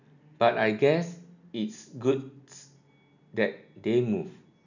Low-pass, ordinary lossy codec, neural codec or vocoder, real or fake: 7.2 kHz; none; none; real